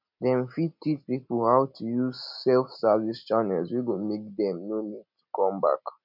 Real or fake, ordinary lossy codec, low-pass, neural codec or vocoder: real; none; 5.4 kHz; none